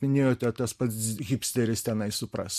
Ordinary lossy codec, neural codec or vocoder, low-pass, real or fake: MP3, 64 kbps; none; 14.4 kHz; real